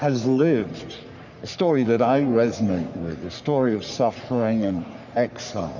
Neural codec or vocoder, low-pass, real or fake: codec, 44.1 kHz, 3.4 kbps, Pupu-Codec; 7.2 kHz; fake